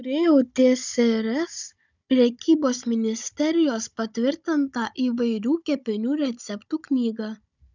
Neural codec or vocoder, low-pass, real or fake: codec, 16 kHz, 16 kbps, FunCodec, trained on Chinese and English, 50 frames a second; 7.2 kHz; fake